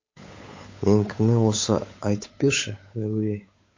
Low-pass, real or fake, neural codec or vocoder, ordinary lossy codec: 7.2 kHz; fake; codec, 16 kHz, 8 kbps, FunCodec, trained on Chinese and English, 25 frames a second; MP3, 32 kbps